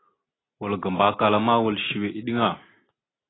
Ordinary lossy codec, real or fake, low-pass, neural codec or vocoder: AAC, 16 kbps; real; 7.2 kHz; none